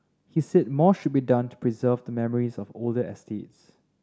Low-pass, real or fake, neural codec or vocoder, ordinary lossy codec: none; real; none; none